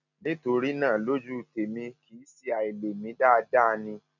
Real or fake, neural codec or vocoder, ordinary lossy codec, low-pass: real; none; none; 7.2 kHz